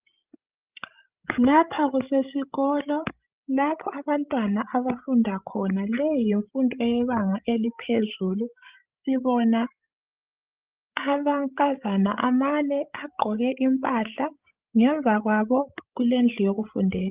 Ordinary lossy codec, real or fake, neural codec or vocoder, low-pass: Opus, 32 kbps; fake; codec, 16 kHz, 16 kbps, FreqCodec, larger model; 3.6 kHz